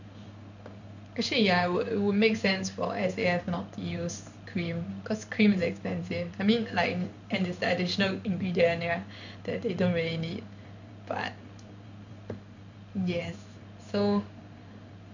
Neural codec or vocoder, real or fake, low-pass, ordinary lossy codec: codec, 16 kHz in and 24 kHz out, 1 kbps, XY-Tokenizer; fake; 7.2 kHz; none